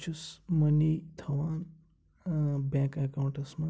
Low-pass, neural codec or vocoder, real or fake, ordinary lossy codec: none; none; real; none